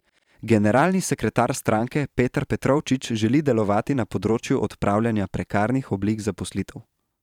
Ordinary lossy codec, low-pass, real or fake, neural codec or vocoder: none; 19.8 kHz; fake; vocoder, 44.1 kHz, 128 mel bands every 512 samples, BigVGAN v2